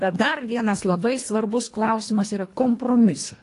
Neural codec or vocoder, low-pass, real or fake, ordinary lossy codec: codec, 24 kHz, 1.5 kbps, HILCodec; 10.8 kHz; fake; AAC, 48 kbps